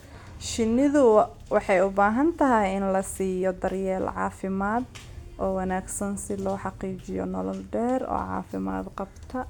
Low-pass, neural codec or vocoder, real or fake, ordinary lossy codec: 19.8 kHz; none; real; none